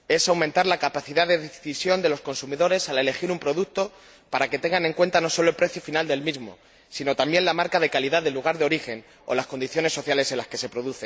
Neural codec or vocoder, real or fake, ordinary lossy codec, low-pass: none; real; none; none